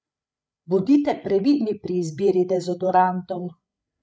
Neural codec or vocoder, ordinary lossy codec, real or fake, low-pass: codec, 16 kHz, 8 kbps, FreqCodec, larger model; none; fake; none